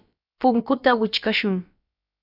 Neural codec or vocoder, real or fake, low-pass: codec, 16 kHz, about 1 kbps, DyCAST, with the encoder's durations; fake; 5.4 kHz